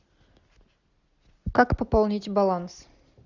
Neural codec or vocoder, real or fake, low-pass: none; real; 7.2 kHz